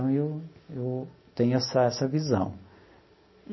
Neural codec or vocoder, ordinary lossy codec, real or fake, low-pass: none; MP3, 24 kbps; real; 7.2 kHz